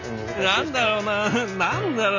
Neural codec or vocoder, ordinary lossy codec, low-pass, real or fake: none; none; 7.2 kHz; real